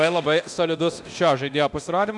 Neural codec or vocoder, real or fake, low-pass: codec, 24 kHz, 0.9 kbps, DualCodec; fake; 10.8 kHz